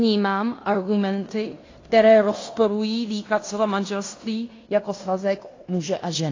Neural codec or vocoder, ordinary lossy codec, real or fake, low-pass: codec, 16 kHz in and 24 kHz out, 0.9 kbps, LongCat-Audio-Codec, fine tuned four codebook decoder; AAC, 32 kbps; fake; 7.2 kHz